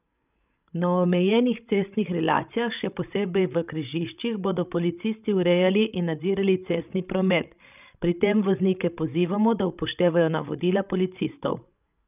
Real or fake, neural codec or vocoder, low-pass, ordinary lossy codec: fake; codec, 16 kHz, 16 kbps, FreqCodec, larger model; 3.6 kHz; none